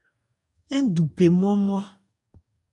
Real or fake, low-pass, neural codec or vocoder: fake; 10.8 kHz; codec, 44.1 kHz, 2.6 kbps, DAC